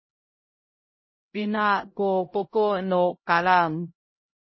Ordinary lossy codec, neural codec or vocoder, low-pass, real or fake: MP3, 24 kbps; codec, 16 kHz, 0.5 kbps, X-Codec, HuBERT features, trained on LibriSpeech; 7.2 kHz; fake